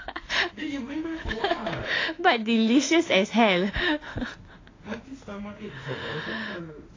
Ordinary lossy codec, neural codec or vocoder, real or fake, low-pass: AAC, 32 kbps; autoencoder, 48 kHz, 32 numbers a frame, DAC-VAE, trained on Japanese speech; fake; 7.2 kHz